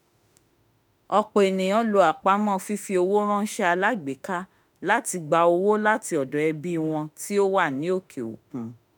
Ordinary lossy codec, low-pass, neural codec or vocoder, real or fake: none; none; autoencoder, 48 kHz, 32 numbers a frame, DAC-VAE, trained on Japanese speech; fake